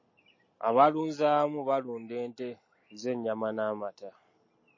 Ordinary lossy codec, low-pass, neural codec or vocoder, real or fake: MP3, 32 kbps; 7.2 kHz; none; real